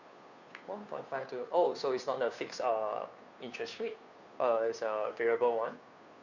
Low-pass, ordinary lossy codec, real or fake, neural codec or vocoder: 7.2 kHz; none; fake; codec, 16 kHz, 2 kbps, FunCodec, trained on Chinese and English, 25 frames a second